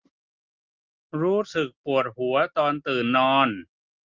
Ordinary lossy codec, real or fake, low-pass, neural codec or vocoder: Opus, 32 kbps; real; 7.2 kHz; none